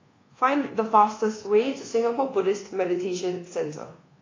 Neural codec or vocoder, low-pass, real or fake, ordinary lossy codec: codec, 24 kHz, 1.2 kbps, DualCodec; 7.2 kHz; fake; AAC, 32 kbps